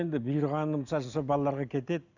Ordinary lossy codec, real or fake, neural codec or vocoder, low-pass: none; real; none; 7.2 kHz